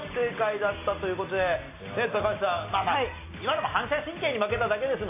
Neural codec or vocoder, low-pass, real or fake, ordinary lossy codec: none; 3.6 kHz; real; AAC, 24 kbps